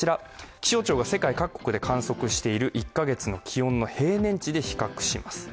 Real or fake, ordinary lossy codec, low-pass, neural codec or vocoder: real; none; none; none